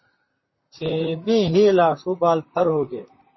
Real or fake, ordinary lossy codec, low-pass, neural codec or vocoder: fake; MP3, 24 kbps; 7.2 kHz; vocoder, 44.1 kHz, 128 mel bands, Pupu-Vocoder